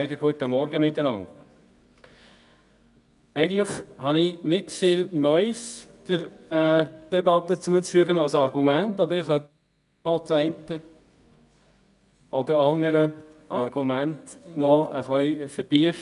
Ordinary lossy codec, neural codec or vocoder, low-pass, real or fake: none; codec, 24 kHz, 0.9 kbps, WavTokenizer, medium music audio release; 10.8 kHz; fake